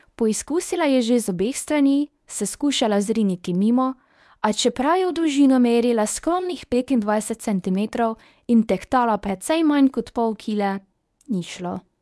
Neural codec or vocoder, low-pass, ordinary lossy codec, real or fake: codec, 24 kHz, 0.9 kbps, WavTokenizer, medium speech release version 1; none; none; fake